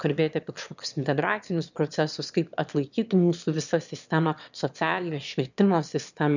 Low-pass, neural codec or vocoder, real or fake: 7.2 kHz; autoencoder, 22.05 kHz, a latent of 192 numbers a frame, VITS, trained on one speaker; fake